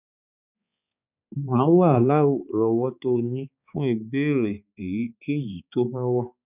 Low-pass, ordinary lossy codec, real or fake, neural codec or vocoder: 3.6 kHz; none; fake; codec, 16 kHz, 4 kbps, X-Codec, HuBERT features, trained on balanced general audio